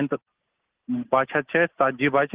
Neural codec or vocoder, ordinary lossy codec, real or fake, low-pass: none; Opus, 16 kbps; real; 3.6 kHz